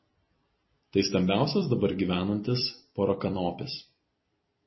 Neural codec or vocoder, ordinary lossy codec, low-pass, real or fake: vocoder, 44.1 kHz, 128 mel bands every 256 samples, BigVGAN v2; MP3, 24 kbps; 7.2 kHz; fake